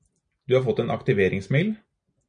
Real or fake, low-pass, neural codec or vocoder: real; 9.9 kHz; none